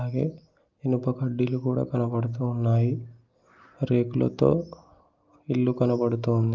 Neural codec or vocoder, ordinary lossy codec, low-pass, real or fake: none; Opus, 24 kbps; 7.2 kHz; real